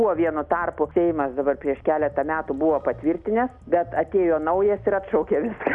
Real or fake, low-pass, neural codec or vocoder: real; 10.8 kHz; none